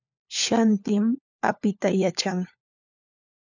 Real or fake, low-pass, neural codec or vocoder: fake; 7.2 kHz; codec, 16 kHz, 4 kbps, FunCodec, trained on LibriTTS, 50 frames a second